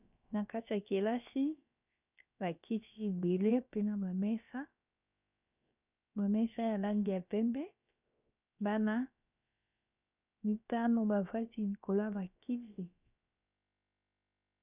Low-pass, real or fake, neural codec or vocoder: 3.6 kHz; fake; codec, 16 kHz, about 1 kbps, DyCAST, with the encoder's durations